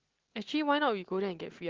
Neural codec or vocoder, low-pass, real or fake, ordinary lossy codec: none; 7.2 kHz; real; Opus, 16 kbps